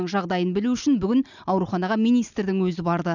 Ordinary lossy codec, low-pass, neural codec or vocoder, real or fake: none; 7.2 kHz; none; real